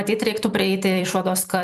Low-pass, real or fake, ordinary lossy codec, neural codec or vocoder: 14.4 kHz; fake; MP3, 96 kbps; vocoder, 44.1 kHz, 128 mel bands every 256 samples, BigVGAN v2